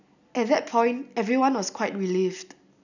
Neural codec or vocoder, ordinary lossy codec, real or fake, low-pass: none; none; real; 7.2 kHz